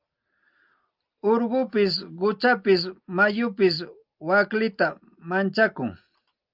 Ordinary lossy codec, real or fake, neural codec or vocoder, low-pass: Opus, 24 kbps; real; none; 5.4 kHz